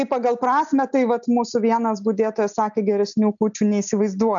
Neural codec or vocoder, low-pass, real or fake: none; 7.2 kHz; real